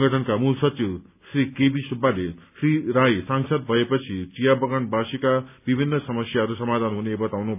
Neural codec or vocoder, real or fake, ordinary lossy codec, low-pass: none; real; none; 3.6 kHz